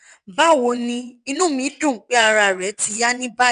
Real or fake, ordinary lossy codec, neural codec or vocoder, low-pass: fake; none; vocoder, 22.05 kHz, 80 mel bands, WaveNeXt; 9.9 kHz